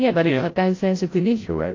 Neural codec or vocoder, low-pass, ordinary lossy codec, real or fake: codec, 16 kHz, 0.5 kbps, FreqCodec, larger model; 7.2 kHz; AAC, 32 kbps; fake